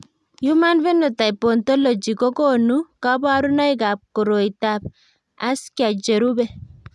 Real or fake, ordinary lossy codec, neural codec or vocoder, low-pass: real; none; none; none